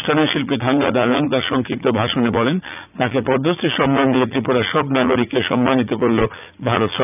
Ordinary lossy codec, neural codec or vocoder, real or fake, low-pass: none; vocoder, 44.1 kHz, 80 mel bands, Vocos; fake; 3.6 kHz